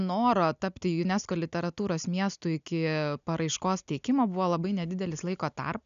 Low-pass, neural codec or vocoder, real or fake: 7.2 kHz; none; real